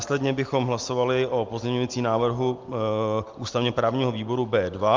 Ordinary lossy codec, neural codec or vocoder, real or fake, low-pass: Opus, 32 kbps; none; real; 7.2 kHz